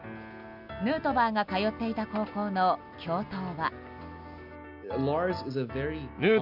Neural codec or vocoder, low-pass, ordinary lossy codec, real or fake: none; 5.4 kHz; none; real